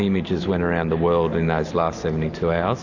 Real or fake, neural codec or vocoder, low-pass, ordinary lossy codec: real; none; 7.2 kHz; AAC, 48 kbps